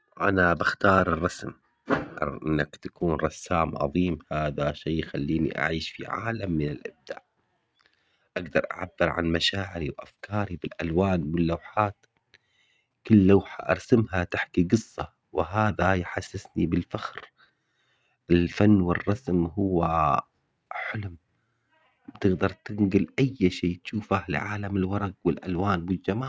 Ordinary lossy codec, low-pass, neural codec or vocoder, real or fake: none; none; none; real